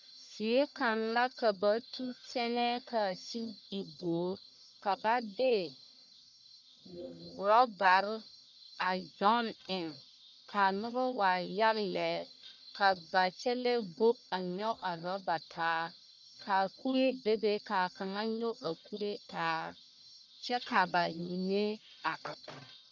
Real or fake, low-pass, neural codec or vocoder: fake; 7.2 kHz; codec, 44.1 kHz, 1.7 kbps, Pupu-Codec